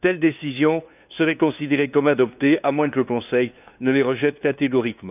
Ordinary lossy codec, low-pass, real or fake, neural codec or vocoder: none; 3.6 kHz; fake; codec, 16 kHz, 2 kbps, FunCodec, trained on LibriTTS, 25 frames a second